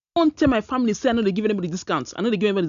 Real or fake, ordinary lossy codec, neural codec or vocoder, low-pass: real; none; none; 7.2 kHz